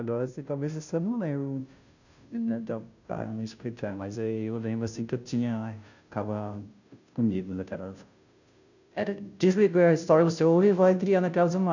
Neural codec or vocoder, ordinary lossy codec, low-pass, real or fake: codec, 16 kHz, 0.5 kbps, FunCodec, trained on Chinese and English, 25 frames a second; none; 7.2 kHz; fake